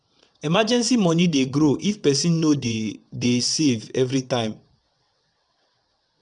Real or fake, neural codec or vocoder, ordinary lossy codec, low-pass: fake; vocoder, 22.05 kHz, 80 mel bands, WaveNeXt; none; 9.9 kHz